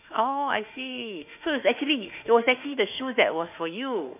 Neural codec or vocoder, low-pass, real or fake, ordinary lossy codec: autoencoder, 48 kHz, 32 numbers a frame, DAC-VAE, trained on Japanese speech; 3.6 kHz; fake; none